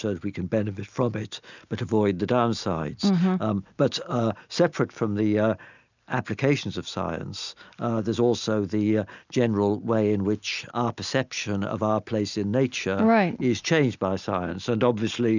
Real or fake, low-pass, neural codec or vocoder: real; 7.2 kHz; none